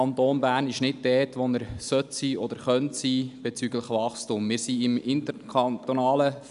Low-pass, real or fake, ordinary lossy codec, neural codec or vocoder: 10.8 kHz; real; none; none